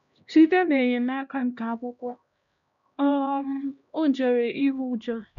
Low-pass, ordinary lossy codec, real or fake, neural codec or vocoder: 7.2 kHz; none; fake; codec, 16 kHz, 1 kbps, X-Codec, HuBERT features, trained on LibriSpeech